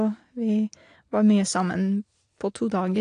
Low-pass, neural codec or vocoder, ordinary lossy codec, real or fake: 9.9 kHz; none; AAC, 48 kbps; real